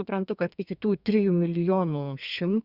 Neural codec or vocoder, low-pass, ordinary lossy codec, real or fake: codec, 32 kHz, 1.9 kbps, SNAC; 5.4 kHz; Opus, 64 kbps; fake